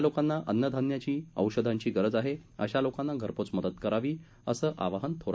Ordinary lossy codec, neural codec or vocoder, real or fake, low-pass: none; none; real; none